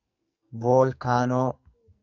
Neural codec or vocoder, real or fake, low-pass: codec, 44.1 kHz, 2.6 kbps, SNAC; fake; 7.2 kHz